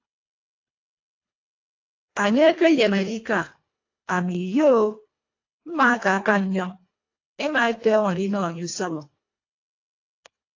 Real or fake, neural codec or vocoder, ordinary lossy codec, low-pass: fake; codec, 24 kHz, 1.5 kbps, HILCodec; AAC, 48 kbps; 7.2 kHz